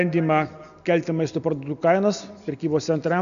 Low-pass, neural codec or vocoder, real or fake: 7.2 kHz; none; real